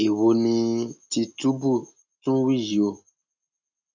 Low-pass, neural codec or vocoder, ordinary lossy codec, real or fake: 7.2 kHz; none; none; real